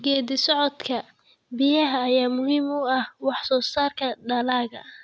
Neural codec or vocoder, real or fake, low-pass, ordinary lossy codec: none; real; none; none